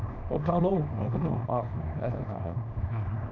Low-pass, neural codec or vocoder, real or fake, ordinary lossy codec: 7.2 kHz; codec, 24 kHz, 0.9 kbps, WavTokenizer, small release; fake; none